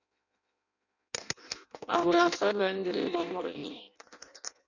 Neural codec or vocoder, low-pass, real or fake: codec, 16 kHz in and 24 kHz out, 0.6 kbps, FireRedTTS-2 codec; 7.2 kHz; fake